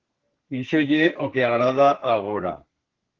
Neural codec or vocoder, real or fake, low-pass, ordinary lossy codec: codec, 32 kHz, 1.9 kbps, SNAC; fake; 7.2 kHz; Opus, 16 kbps